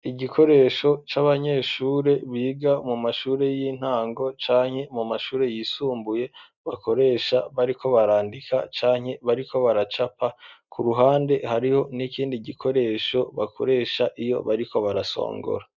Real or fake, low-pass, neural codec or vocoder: real; 7.2 kHz; none